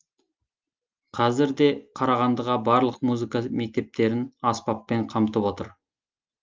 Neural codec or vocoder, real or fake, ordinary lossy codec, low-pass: none; real; Opus, 24 kbps; 7.2 kHz